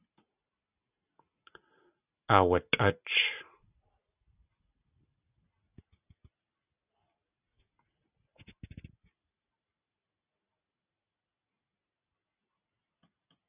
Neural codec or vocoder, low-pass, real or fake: none; 3.6 kHz; real